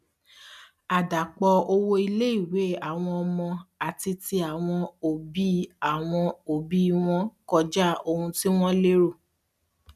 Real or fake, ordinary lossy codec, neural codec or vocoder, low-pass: real; none; none; 14.4 kHz